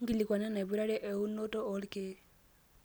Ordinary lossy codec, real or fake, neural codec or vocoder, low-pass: none; real; none; none